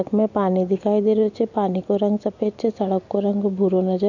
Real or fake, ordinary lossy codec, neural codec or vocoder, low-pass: real; none; none; 7.2 kHz